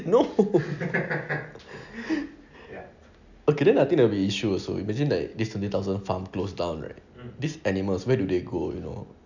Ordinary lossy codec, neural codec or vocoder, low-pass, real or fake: none; none; 7.2 kHz; real